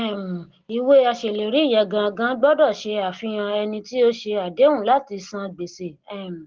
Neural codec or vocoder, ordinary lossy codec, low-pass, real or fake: none; Opus, 16 kbps; 7.2 kHz; real